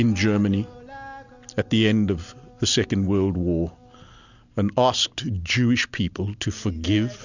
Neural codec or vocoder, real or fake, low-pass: none; real; 7.2 kHz